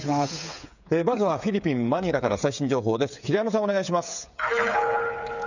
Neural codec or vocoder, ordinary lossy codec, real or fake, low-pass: codec, 16 kHz, 8 kbps, FreqCodec, smaller model; none; fake; 7.2 kHz